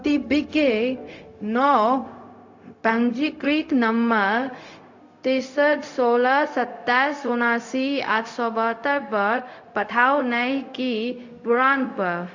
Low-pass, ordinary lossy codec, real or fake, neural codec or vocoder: 7.2 kHz; AAC, 48 kbps; fake; codec, 16 kHz, 0.4 kbps, LongCat-Audio-Codec